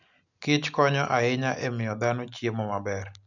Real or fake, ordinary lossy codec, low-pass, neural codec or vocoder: real; none; 7.2 kHz; none